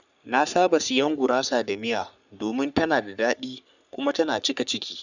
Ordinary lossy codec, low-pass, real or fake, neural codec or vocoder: none; 7.2 kHz; fake; codec, 44.1 kHz, 3.4 kbps, Pupu-Codec